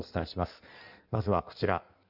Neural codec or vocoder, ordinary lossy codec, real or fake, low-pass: codec, 16 kHz in and 24 kHz out, 1.1 kbps, FireRedTTS-2 codec; none; fake; 5.4 kHz